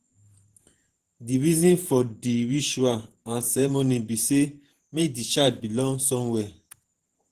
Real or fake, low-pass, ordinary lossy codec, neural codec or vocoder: fake; 14.4 kHz; Opus, 16 kbps; vocoder, 48 kHz, 128 mel bands, Vocos